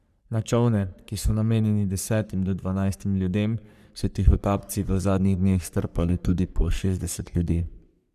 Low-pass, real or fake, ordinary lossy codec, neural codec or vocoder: 14.4 kHz; fake; none; codec, 44.1 kHz, 3.4 kbps, Pupu-Codec